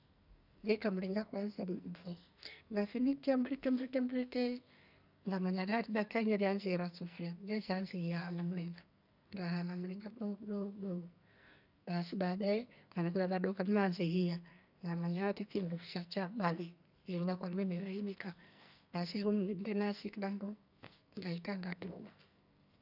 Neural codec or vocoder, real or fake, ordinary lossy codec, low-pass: codec, 44.1 kHz, 2.6 kbps, SNAC; fake; none; 5.4 kHz